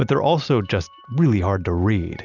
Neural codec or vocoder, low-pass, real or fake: none; 7.2 kHz; real